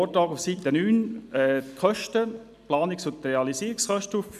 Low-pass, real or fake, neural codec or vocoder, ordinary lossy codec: 14.4 kHz; real; none; none